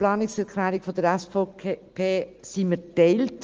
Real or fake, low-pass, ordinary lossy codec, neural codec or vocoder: real; 7.2 kHz; Opus, 24 kbps; none